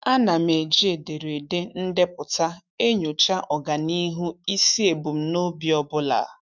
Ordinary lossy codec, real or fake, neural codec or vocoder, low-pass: none; fake; autoencoder, 48 kHz, 128 numbers a frame, DAC-VAE, trained on Japanese speech; 7.2 kHz